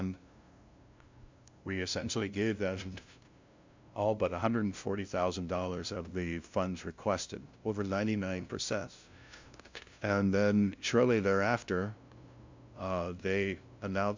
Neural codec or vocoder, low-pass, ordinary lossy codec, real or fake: codec, 16 kHz, 0.5 kbps, FunCodec, trained on LibriTTS, 25 frames a second; 7.2 kHz; MP3, 64 kbps; fake